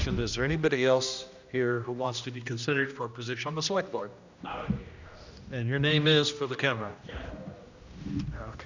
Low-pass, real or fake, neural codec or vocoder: 7.2 kHz; fake; codec, 16 kHz, 1 kbps, X-Codec, HuBERT features, trained on general audio